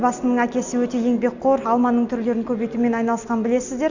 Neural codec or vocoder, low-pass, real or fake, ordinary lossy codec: none; 7.2 kHz; real; none